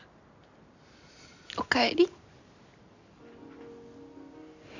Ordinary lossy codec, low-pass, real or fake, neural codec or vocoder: none; 7.2 kHz; real; none